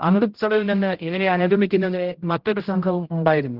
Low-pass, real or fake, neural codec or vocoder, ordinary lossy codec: 5.4 kHz; fake; codec, 16 kHz, 0.5 kbps, X-Codec, HuBERT features, trained on general audio; Opus, 16 kbps